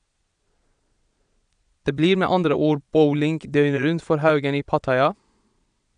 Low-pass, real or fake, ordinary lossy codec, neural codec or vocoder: 9.9 kHz; fake; none; vocoder, 22.05 kHz, 80 mel bands, Vocos